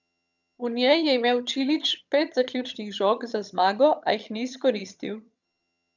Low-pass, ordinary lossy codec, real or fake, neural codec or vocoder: 7.2 kHz; none; fake; vocoder, 22.05 kHz, 80 mel bands, HiFi-GAN